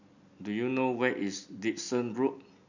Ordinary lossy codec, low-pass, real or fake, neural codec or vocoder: none; 7.2 kHz; real; none